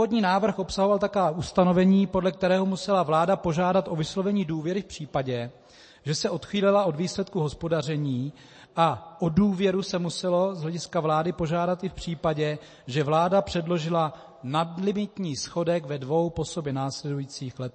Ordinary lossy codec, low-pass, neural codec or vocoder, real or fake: MP3, 32 kbps; 9.9 kHz; none; real